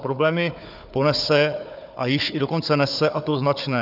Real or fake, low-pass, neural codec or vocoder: fake; 5.4 kHz; codec, 16 kHz, 4 kbps, FunCodec, trained on Chinese and English, 50 frames a second